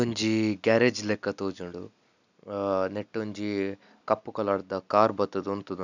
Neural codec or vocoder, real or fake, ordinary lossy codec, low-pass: none; real; none; 7.2 kHz